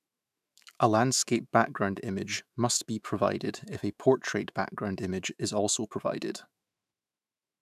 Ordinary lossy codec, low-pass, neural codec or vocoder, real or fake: none; 14.4 kHz; autoencoder, 48 kHz, 128 numbers a frame, DAC-VAE, trained on Japanese speech; fake